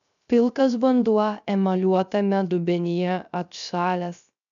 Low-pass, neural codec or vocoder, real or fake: 7.2 kHz; codec, 16 kHz, 0.3 kbps, FocalCodec; fake